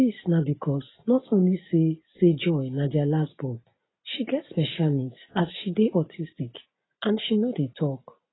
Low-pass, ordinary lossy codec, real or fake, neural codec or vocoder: 7.2 kHz; AAC, 16 kbps; real; none